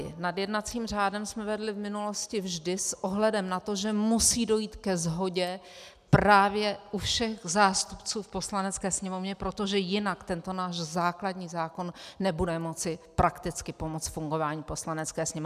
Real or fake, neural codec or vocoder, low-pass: real; none; 14.4 kHz